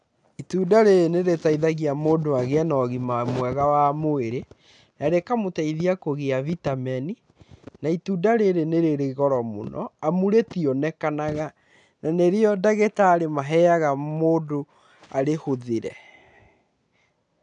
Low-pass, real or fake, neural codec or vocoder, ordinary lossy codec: 9.9 kHz; real; none; none